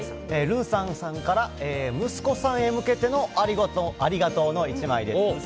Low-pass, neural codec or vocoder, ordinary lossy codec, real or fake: none; none; none; real